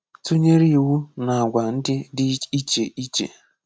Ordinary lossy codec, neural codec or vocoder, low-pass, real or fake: none; none; none; real